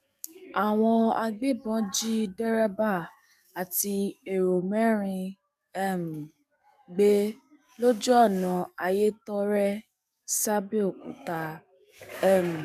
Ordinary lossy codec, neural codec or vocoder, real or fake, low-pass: none; codec, 44.1 kHz, 7.8 kbps, DAC; fake; 14.4 kHz